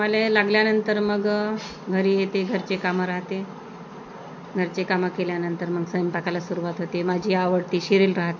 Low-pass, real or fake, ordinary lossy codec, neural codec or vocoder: 7.2 kHz; real; MP3, 48 kbps; none